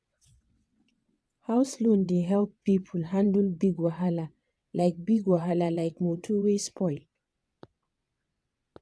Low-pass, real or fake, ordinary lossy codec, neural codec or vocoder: none; fake; none; vocoder, 22.05 kHz, 80 mel bands, WaveNeXt